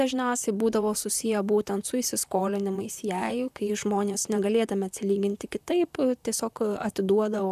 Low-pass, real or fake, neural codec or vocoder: 14.4 kHz; fake; vocoder, 44.1 kHz, 128 mel bands, Pupu-Vocoder